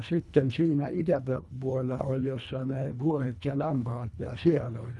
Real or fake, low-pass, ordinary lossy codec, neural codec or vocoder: fake; none; none; codec, 24 kHz, 1.5 kbps, HILCodec